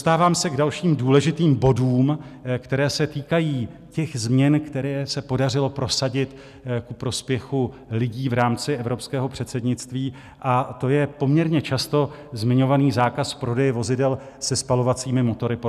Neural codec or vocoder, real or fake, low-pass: none; real; 14.4 kHz